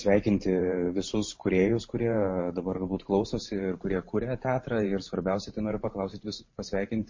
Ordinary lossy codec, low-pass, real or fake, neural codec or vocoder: MP3, 32 kbps; 7.2 kHz; fake; vocoder, 44.1 kHz, 128 mel bands every 512 samples, BigVGAN v2